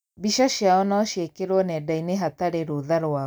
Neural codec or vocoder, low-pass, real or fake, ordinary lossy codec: none; none; real; none